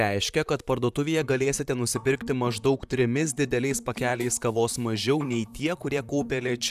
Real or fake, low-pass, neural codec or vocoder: fake; 14.4 kHz; vocoder, 44.1 kHz, 128 mel bands, Pupu-Vocoder